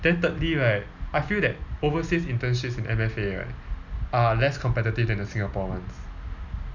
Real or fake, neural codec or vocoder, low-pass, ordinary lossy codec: real; none; 7.2 kHz; none